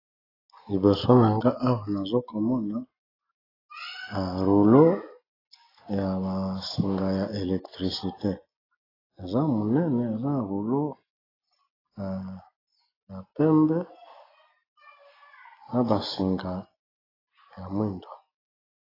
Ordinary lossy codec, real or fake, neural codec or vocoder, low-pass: AAC, 24 kbps; real; none; 5.4 kHz